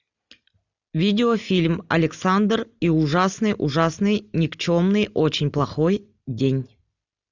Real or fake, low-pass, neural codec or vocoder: real; 7.2 kHz; none